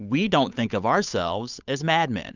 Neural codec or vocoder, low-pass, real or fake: codec, 16 kHz, 8 kbps, FunCodec, trained on Chinese and English, 25 frames a second; 7.2 kHz; fake